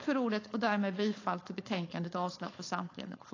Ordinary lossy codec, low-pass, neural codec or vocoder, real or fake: AAC, 32 kbps; 7.2 kHz; codec, 16 kHz, 4.8 kbps, FACodec; fake